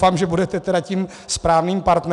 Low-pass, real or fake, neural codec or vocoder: 10.8 kHz; real; none